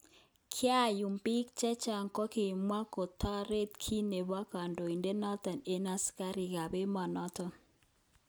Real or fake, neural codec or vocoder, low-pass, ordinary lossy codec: real; none; none; none